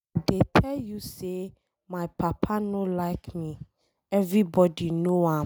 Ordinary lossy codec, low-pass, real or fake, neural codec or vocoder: none; none; real; none